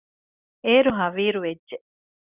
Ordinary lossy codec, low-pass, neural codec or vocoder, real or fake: Opus, 64 kbps; 3.6 kHz; none; real